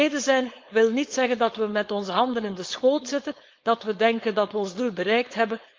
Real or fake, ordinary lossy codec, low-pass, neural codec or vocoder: fake; Opus, 32 kbps; 7.2 kHz; codec, 16 kHz, 4.8 kbps, FACodec